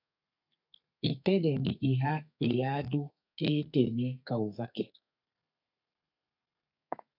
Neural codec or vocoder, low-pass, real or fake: codec, 32 kHz, 1.9 kbps, SNAC; 5.4 kHz; fake